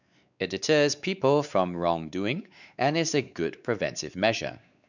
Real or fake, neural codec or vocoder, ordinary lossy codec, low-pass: fake; codec, 16 kHz, 4 kbps, X-Codec, WavLM features, trained on Multilingual LibriSpeech; none; 7.2 kHz